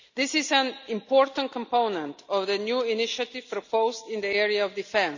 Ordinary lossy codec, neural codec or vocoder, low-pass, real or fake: none; none; 7.2 kHz; real